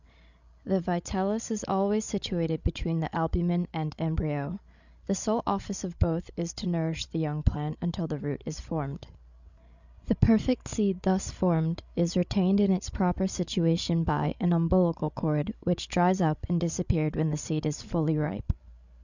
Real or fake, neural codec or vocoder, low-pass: fake; codec, 16 kHz, 16 kbps, FreqCodec, larger model; 7.2 kHz